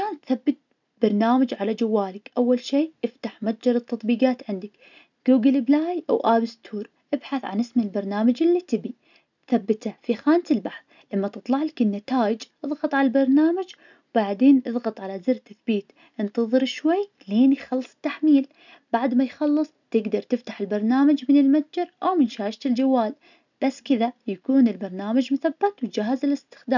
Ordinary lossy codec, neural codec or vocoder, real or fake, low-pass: none; none; real; 7.2 kHz